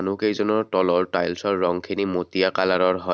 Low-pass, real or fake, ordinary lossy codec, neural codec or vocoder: none; real; none; none